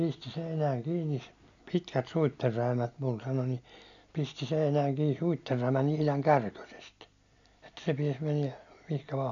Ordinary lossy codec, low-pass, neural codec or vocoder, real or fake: none; 7.2 kHz; none; real